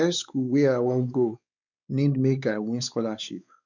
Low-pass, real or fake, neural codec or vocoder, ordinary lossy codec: 7.2 kHz; fake; codec, 16 kHz, 4 kbps, X-Codec, WavLM features, trained on Multilingual LibriSpeech; none